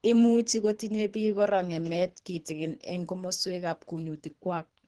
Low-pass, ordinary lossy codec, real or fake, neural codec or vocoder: 10.8 kHz; Opus, 16 kbps; fake; codec, 24 kHz, 3 kbps, HILCodec